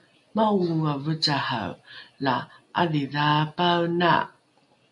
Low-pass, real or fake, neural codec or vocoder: 10.8 kHz; real; none